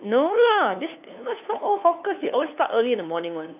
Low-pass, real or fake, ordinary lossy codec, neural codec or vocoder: 3.6 kHz; fake; none; codec, 16 kHz, 2 kbps, FunCodec, trained on LibriTTS, 25 frames a second